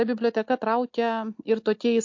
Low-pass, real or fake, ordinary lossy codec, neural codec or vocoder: 7.2 kHz; real; MP3, 48 kbps; none